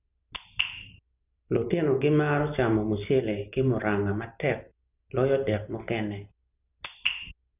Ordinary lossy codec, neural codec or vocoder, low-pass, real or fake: none; none; 3.6 kHz; real